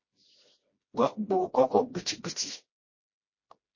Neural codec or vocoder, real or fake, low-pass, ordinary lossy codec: codec, 16 kHz, 1 kbps, FreqCodec, smaller model; fake; 7.2 kHz; MP3, 32 kbps